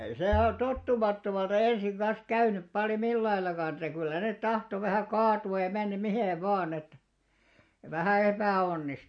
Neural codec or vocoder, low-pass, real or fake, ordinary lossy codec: none; 9.9 kHz; real; MP3, 48 kbps